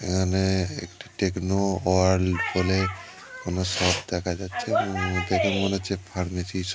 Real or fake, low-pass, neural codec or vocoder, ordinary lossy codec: real; none; none; none